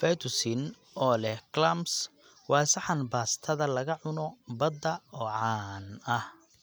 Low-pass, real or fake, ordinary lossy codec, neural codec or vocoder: none; real; none; none